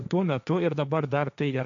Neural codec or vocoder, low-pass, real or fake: codec, 16 kHz, 1.1 kbps, Voila-Tokenizer; 7.2 kHz; fake